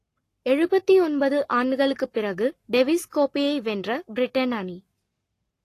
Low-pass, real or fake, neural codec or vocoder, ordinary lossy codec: 14.4 kHz; fake; codec, 44.1 kHz, 7.8 kbps, Pupu-Codec; AAC, 48 kbps